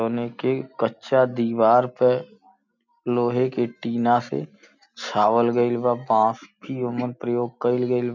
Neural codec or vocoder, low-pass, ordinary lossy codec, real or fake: none; 7.2 kHz; none; real